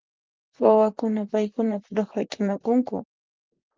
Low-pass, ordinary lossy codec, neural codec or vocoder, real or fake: 7.2 kHz; Opus, 24 kbps; vocoder, 44.1 kHz, 128 mel bands, Pupu-Vocoder; fake